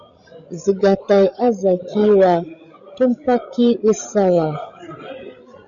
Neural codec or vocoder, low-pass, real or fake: codec, 16 kHz, 16 kbps, FreqCodec, larger model; 7.2 kHz; fake